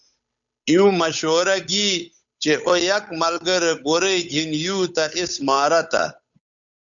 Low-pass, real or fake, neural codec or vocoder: 7.2 kHz; fake; codec, 16 kHz, 8 kbps, FunCodec, trained on Chinese and English, 25 frames a second